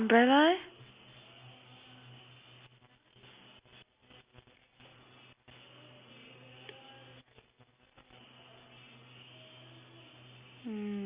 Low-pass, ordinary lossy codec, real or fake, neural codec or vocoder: 3.6 kHz; Opus, 64 kbps; real; none